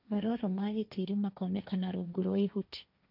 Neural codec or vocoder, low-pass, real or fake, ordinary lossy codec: codec, 16 kHz, 1.1 kbps, Voila-Tokenizer; 5.4 kHz; fake; none